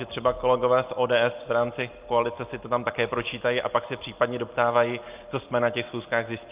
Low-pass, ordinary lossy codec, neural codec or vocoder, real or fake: 3.6 kHz; Opus, 32 kbps; none; real